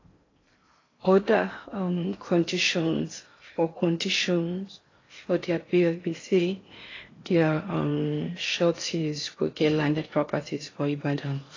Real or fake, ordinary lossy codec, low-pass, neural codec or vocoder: fake; AAC, 32 kbps; 7.2 kHz; codec, 16 kHz in and 24 kHz out, 0.6 kbps, FocalCodec, streaming, 4096 codes